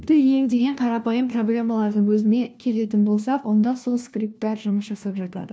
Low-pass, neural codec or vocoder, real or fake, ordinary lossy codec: none; codec, 16 kHz, 1 kbps, FunCodec, trained on LibriTTS, 50 frames a second; fake; none